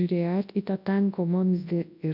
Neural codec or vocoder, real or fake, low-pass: codec, 24 kHz, 0.9 kbps, WavTokenizer, large speech release; fake; 5.4 kHz